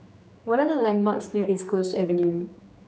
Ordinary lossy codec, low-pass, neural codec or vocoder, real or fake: none; none; codec, 16 kHz, 2 kbps, X-Codec, HuBERT features, trained on general audio; fake